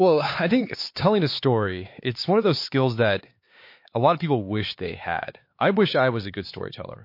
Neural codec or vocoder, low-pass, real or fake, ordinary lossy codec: codec, 16 kHz, 4 kbps, X-Codec, HuBERT features, trained on LibriSpeech; 5.4 kHz; fake; MP3, 32 kbps